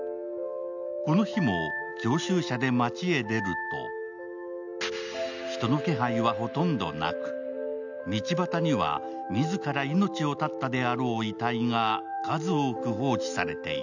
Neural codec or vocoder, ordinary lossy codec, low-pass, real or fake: none; none; 7.2 kHz; real